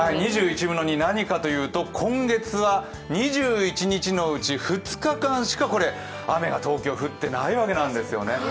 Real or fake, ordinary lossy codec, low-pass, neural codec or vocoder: real; none; none; none